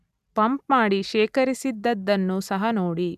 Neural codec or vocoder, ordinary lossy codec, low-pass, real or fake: none; none; 14.4 kHz; real